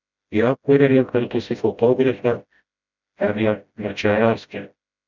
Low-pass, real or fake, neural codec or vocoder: 7.2 kHz; fake; codec, 16 kHz, 0.5 kbps, FreqCodec, smaller model